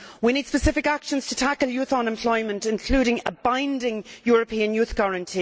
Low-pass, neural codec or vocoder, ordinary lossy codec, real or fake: none; none; none; real